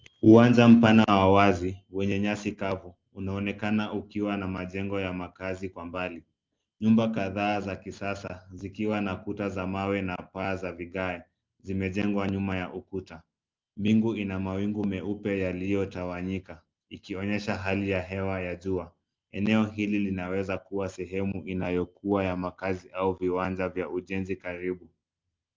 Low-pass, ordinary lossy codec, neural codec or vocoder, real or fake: 7.2 kHz; Opus, 32 kbps; none; real